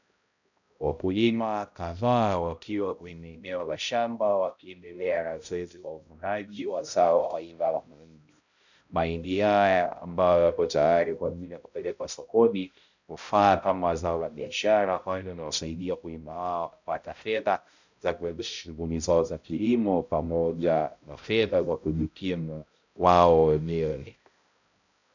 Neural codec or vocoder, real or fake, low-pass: codec, 16 kHz, 0.5 kbps, X-Codec, HuBERT features, trained on balanced general audio; fake; 7.2 kHz